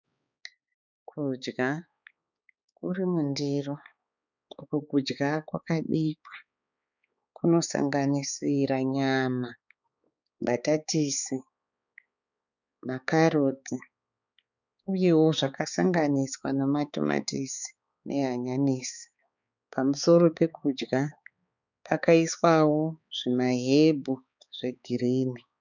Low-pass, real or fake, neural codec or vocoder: 7.2 kHz; fake; codec, 16 kHz, 4 kbps, X-Codec, HuBERT features, trained on balanced general audio